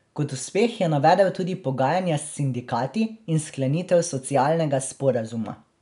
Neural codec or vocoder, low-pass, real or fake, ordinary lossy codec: none; 10.8 kHz; real; none